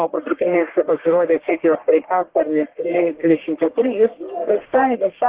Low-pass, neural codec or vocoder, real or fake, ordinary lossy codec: 3.6 kHz; codec, 44.1 kHz, 1.7 kbps, Pupu-Codec; fake; Opus, 16 kbps